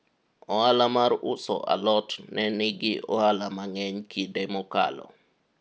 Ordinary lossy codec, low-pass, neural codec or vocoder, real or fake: none; none; none; real